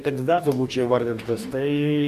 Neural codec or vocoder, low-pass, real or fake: codec, 44.1 kHz, 2.6 kbps, DAC; 14.4 kHz; fake